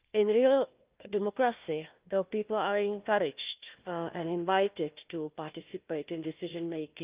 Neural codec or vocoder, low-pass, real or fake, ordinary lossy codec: codec, 16 kHz, 1 kbps, FunCodec, trained on Chinese and English, 50 frames a second; 3.6 kHz; fake; Opus, 32 kbps